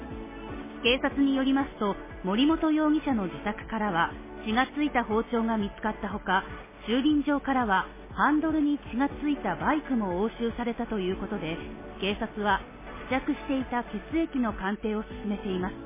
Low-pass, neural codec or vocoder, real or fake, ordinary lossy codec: 3.6 kHz; none; real; MP3, 16 kbps